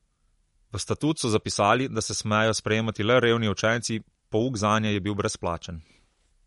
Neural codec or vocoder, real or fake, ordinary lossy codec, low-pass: none; real; MP3, 48 kbps; 14.4 kHz